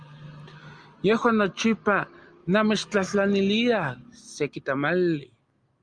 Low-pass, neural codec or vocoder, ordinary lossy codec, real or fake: 9.9 kHz; none; Opus, 32 kbps; real